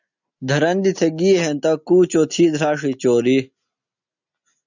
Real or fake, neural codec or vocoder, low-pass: real; none; 7.2 kHz